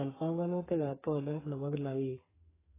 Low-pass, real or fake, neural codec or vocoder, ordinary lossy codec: 3.6 kHz; fake; codec, 44.1 kHz, 2.6 kbps, SNAC; AAC, 16 kbps